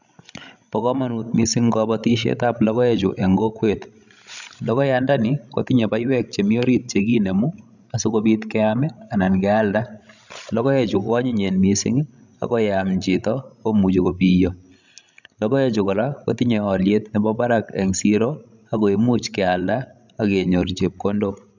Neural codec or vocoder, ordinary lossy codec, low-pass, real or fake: codec, 16 kHz, 16 kbps, FreqCodec, larger model; none; 7.2 kHz; fake